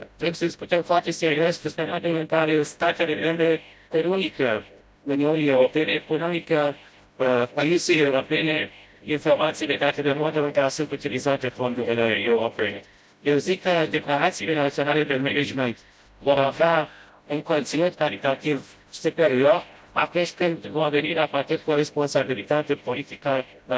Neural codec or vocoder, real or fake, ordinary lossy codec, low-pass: codec, 16 kHz, 0.5 kbps, FreqCodec, smaller model; fake; none; none